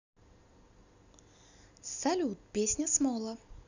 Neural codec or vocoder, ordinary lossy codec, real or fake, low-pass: none; none; real; 7.2 kHz